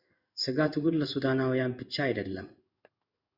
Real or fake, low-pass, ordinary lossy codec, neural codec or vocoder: fake; 5.4 kHz; Opus, 64 kbps; codec, 16 kHz in and 24 kHz out, 1 kbps, XY-Tokenizer